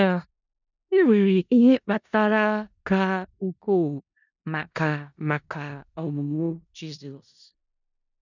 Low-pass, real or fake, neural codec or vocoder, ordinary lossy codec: 7.2 kHz; fake; codec, 16 kHz in and 24 kHz out, 0.4 kbps, LongCat-Audio-Codec, four codebook decoder; none